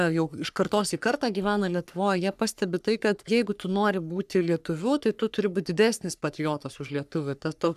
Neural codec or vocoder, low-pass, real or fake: codec, 44.1 kHz, 3.4 kbps, Pupu-Codec; 14.4 kHz; fake